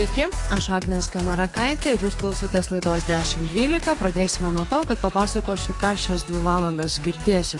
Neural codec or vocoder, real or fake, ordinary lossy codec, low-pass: codec, 32 kHz, 1.9 kbps, SNAC; fake; AAC, 48 kbps; 10.8 kHz